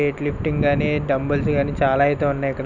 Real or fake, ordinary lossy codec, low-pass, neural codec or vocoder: real; none; 7.2 kHz; none